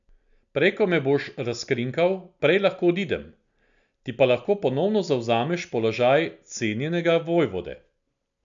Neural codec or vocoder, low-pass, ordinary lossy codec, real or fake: none; 7.2 kHz; none; real